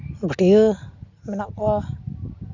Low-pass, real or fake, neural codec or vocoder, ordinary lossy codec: 7.2 kHz; real; none; none